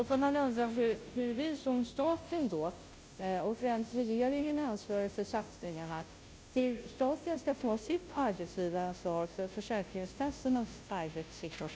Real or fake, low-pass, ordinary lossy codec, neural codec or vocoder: fake; none; none; codec, 16 kHz, 0.5 kbps, FunCodec, trained on Chinese and English, 25 frames a second